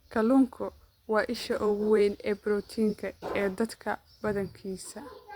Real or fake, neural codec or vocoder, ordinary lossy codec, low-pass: fake; vocoder, 44.1 kHz, 128 mel bands every 512 samples, BigVGAN v2; Opus, 64 kbps; 19.8 kHz